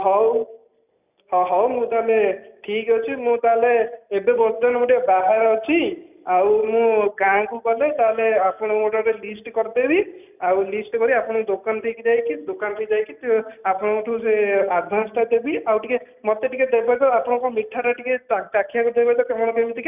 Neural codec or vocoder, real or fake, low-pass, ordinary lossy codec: none; real; 3.6 kHz; none